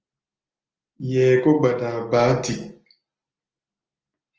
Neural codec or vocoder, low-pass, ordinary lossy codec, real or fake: none; 7.2 kHz; Opus, 24 kbps; real